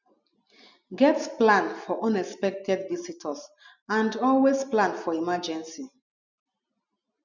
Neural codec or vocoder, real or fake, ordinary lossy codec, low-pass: none; real; none; 7.2 kHz